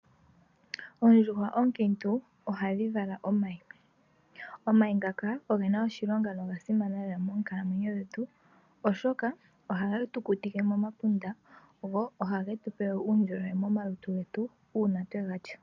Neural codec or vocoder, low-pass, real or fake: none; 7.2 kHz; real